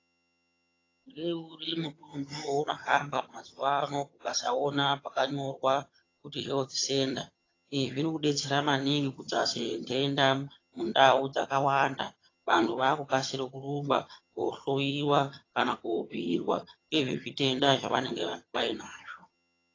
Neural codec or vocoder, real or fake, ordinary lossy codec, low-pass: vocoder, 22.05 kHz, 80 mel bands, HiFi-GAN; fake; AAC, 32 kbps; 7.2 kHz